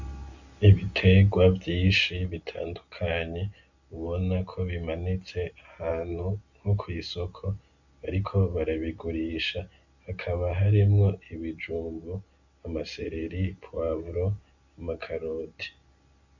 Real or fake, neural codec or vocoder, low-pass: real; none; 7.2 kHz